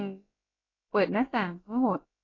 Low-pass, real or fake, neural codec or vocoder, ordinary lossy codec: 7.2 kHz; fake; codec, 16 kHz, about 1 kbps, DyCAST, with the encoder's durations; AAC, 32 kbps